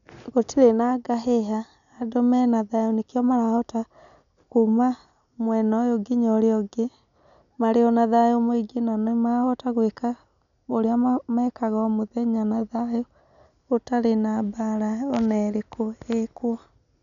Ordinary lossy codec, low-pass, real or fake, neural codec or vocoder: none; 7.2 kHz; real; none